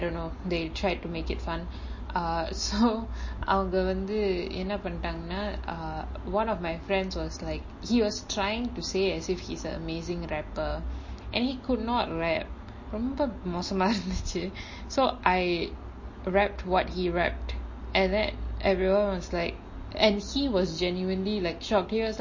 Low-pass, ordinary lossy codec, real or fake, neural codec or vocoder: 7.2 kHz; MP3, 32 kbps; real; none